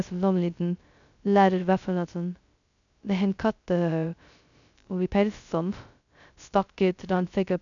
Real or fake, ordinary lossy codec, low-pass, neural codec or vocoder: fake; none; 7.2 kHz; codec, 16 kHz, 0.2 kbps, FocalCodec